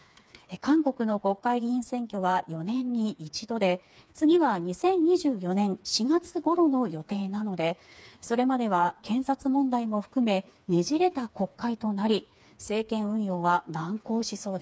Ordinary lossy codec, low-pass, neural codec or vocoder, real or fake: none; none; codec, 16 kHz, 4 kbps, FreqCodec, smaller model; fake